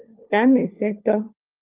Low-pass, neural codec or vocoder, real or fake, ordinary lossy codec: 3.6 kHz; codec, 16 kHz, 4 kbps, FunCodec, trained on LibriTTS, 50 frames a second; fake; Opus, 24 kbps